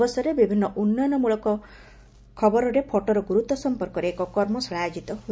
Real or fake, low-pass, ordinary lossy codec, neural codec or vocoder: real; none; none; none